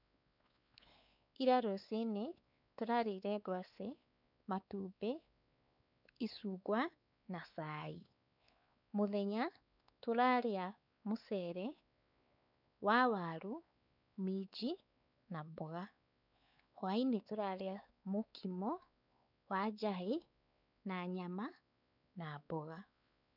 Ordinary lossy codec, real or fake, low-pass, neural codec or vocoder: none; fake; 5.4 kHz; codec, 16 kHz, 4 kbps, X-Codec, WavLM features, trained on Multilingual LibriSpeech